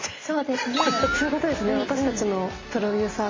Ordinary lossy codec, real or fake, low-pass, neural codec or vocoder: MP3, 32 kbps; real; 7.2 kHz; none